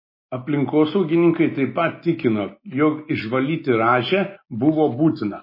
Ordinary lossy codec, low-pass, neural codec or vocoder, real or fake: MP3, 24 kbps; 5.4 kHz; none; real